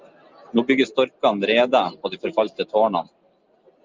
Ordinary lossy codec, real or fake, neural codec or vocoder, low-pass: Opus, 24 kbps; real; none; 7.2 kHz